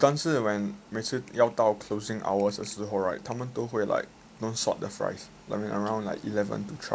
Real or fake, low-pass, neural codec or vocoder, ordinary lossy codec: real; none; none; none